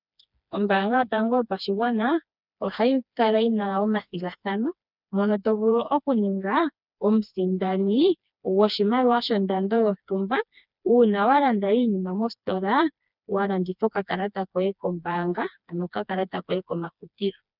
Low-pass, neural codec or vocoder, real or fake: 5.4 kHz; codec, 16 kHz, 2 kbps, FreqCodec, smaller model; fake